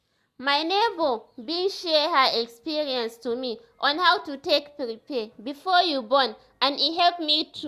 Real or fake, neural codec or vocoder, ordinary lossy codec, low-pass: real; none; Opus, 64 kbps; 14.4 kHz